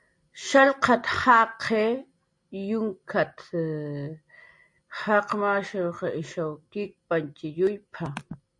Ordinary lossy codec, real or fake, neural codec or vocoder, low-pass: MP3, 48 kbps; real; none; 10.8 kHz